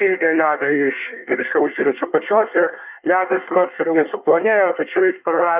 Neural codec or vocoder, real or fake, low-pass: codec, 24 kHz, 1 kbps, SNAC; fake; 3.6 kHz